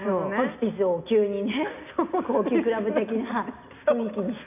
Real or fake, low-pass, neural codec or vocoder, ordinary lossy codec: real; 3.6 kHz; none; none